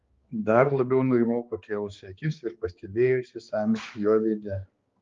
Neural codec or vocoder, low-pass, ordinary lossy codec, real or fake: codec, 16 kHz, 2 kbps, X-Codec, HuBERT features, trained on balanced general audio; 7.2 kHz; Opus, 32 kbps; fake